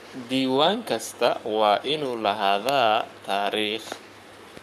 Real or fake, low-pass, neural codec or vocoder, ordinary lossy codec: fake; 14.4 kHz; codec, 44.1 kHz, 7.8 kbps, Pupu-Codec; none